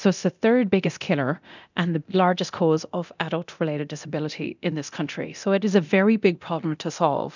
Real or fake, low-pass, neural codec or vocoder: fake; 7.2 kHz; codec, 24 kHz, 0.9 kbps, DualCodec